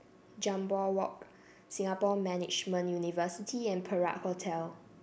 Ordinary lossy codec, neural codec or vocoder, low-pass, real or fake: none; none; none; real